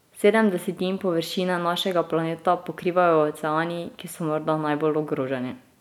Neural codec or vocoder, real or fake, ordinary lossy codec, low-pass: none; real; none; 19.8 kHz